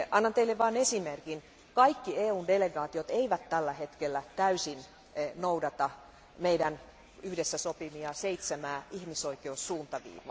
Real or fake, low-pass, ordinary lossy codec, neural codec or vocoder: real; none; none; none